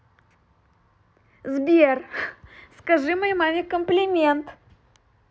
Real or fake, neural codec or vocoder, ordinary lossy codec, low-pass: real; none; none; none